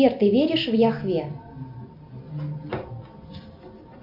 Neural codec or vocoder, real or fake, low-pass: none; real; 5.4 kHz